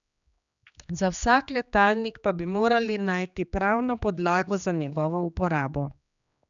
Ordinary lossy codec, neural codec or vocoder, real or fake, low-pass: none; codec, 16 kHz, 2 kbps, X-Codec, HuBERT features, trained on general audio; fake; 7.2 kHz